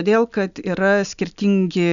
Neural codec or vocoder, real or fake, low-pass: none; real; 7.2 kHz